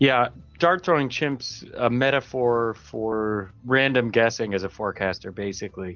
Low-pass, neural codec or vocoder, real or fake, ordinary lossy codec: 7.2 kHz; none; real; Opus, 24 kbps